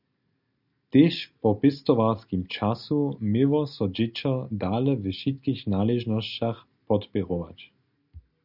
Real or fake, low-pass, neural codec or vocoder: real; 5.4 kHz; none